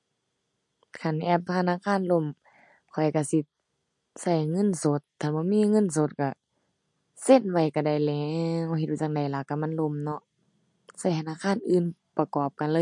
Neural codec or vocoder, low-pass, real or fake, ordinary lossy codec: none; 10.8 kHz; real; MP3, 48 kbps